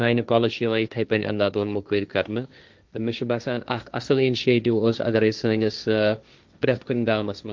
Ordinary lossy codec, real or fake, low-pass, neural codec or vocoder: Opus, 24 kbps; fake; 7.2 kHz; codec, 16 kHz, 1.1 kbps, Voila-Tokenizer